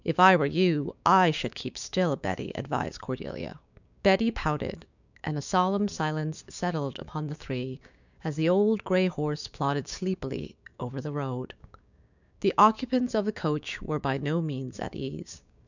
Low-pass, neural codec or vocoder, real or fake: 7.2 kHz; codec, 16 kHz, 6 kbps, DAC; fake